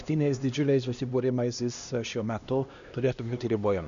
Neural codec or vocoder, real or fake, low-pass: codec, 16 kHz, 1 kbps, X-Codec, HuBERT features, trained on LibriSpeech; fake; 7.2 kHz